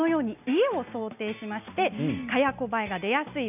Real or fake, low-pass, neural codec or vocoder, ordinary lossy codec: real; 3.6 kHz; none; none